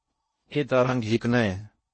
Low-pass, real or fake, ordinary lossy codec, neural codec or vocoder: 9.9 kHz; fake; MP3, 32 kbps; codec, 16 kHz in and 24 kHz out, 0.6 kbps, FocalCodec, streaming, 2048 codes